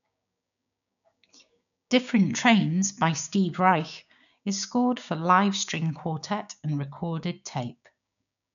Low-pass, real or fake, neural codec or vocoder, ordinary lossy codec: 7.2 kHz; fake; codec, 16 kHz, 6 kbps, DAC; none